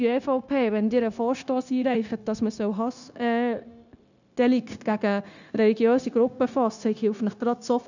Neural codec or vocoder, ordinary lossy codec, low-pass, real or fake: codec, 16 kHz, 0.9 kbps, LongCat-Audio-Codec; none; 7.2 kHz; fake